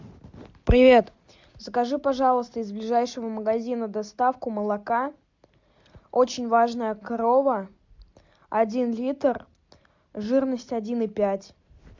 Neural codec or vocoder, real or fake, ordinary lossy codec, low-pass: none; real; MP3, 64 kbps; 7.2 kHz